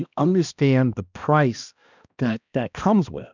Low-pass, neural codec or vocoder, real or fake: 7.2 kHz; codec, 16 kHz, 1 kbps, X-Codec, HuBERT features, trained on balanced general audio; fake